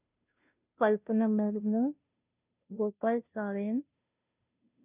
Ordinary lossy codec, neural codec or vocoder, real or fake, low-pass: none; codec, 16 kHz, 0.5 kbps, FunCodec, trained on Chinese and English, 25 frames a second; fake; 3.6 kHz